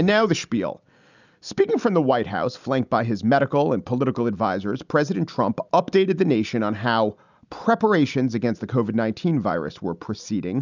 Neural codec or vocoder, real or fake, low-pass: none; real; 7.2 kHz